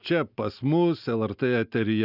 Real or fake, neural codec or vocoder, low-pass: real; none; 5.4 kHz